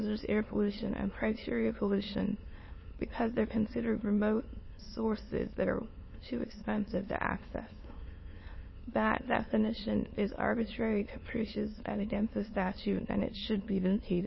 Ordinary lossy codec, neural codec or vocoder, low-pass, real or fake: MP3, 24 kbps; autoencoder, 22.05 kHz, a latent of 192 numbers a frame, VITS, trained on many speakers; 7.2 kHz; fake